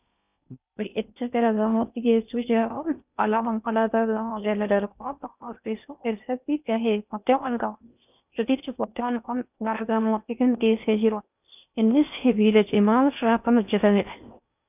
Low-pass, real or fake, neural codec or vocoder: 3.6 kHz; fake; codec, 16 kHz in and 24 kHz out, 0.6 kbps, FocalCodec, streaming, 4096 codes